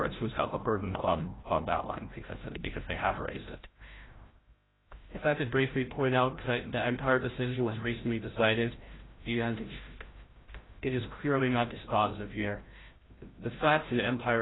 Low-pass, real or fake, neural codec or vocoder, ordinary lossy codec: 7.2 kHz; fake; codec, 16 kHz, 0.5 kbps, FreqCodec, larger model; AAC, 16 kbps